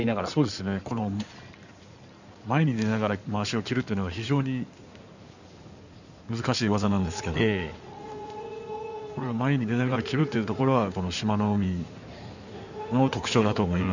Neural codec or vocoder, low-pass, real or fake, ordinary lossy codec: codec, 16 kHz in and 24 kHz out, 2.2 kbps, FireRedTTS-2 codec; 7.2 kHz; fake; none